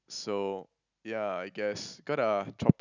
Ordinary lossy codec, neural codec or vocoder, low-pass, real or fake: none; none; 7.2 kHz; real